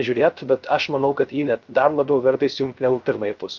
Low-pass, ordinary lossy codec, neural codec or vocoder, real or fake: 7.2 kHz; Opus, 24 kbps; codec, 16 kHz, 0.3 kbps, FocalCodec; fake